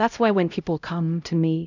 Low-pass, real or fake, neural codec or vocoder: 7.2 kHz; fake; codec, 16 kHz, 0.5 kbps, X-Codec, HuBERT features, trained on LibriSpeech